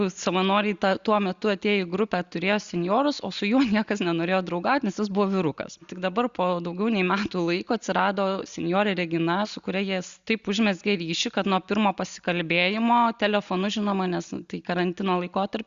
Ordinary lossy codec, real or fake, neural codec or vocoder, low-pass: Opus, 64 kbps; real; none; 7.2 kHz